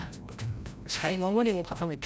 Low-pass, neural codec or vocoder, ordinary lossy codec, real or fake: none; codec, 16 kHz, 0.5 kbps, FreqCodec, larger model; none; fake